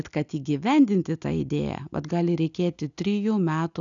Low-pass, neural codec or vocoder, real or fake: 7.2 kHz; none; real